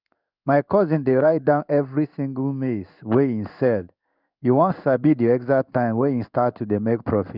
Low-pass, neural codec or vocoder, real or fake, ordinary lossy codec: 5.4 kHz; codec, 16 kHz in and 24 kHz out, 1 kbps, XY-Tokenizer; fake; none